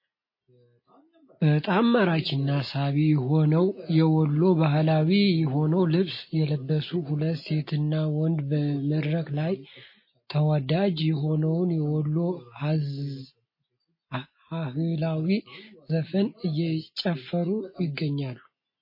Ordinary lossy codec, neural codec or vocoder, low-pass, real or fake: MP3, 24 kbps; none; 5.4 kHz; real